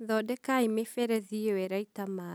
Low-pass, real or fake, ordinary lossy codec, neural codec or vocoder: none; real; none; none